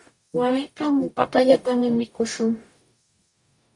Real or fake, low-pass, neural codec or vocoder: fake; 10.8 kHz; codec, 44.1 kHz, 0.9 kbps, DAC